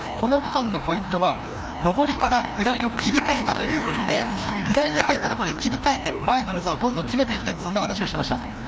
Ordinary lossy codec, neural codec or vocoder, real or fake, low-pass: none; codec, 16 kHz, 1 kbps, FreqCodec, larger model; fake; none